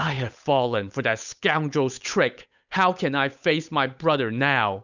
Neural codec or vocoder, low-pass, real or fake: codec, 16 kHz, 8 kbps, FunCodec, trained on Chinese and English, 25 frames a second; 7.2 kHz; fake